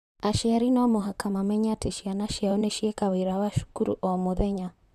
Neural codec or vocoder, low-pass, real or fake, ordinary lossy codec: vocoder, 44.1 kHz, 128 mel bands, Pupu-Vocoder; 14.4 kHz; fake; none